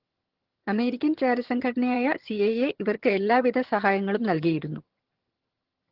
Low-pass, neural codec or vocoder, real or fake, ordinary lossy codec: 5.4 kHz; vocoder, 22.05 kHz, 80 mel bands, HiFi-GAN; fake; Opus, 16 kbps